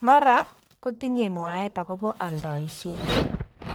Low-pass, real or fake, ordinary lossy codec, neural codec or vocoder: none; fake; none; codec, 44.1 kHz, 1.7 kbps, Pupu-Codec